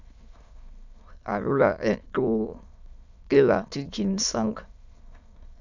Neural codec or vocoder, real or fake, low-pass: autoencoder, 22.05 kHz, a latent of 192 numbers a frame, VITS, trained on many speakers; fake; 7.2 kHz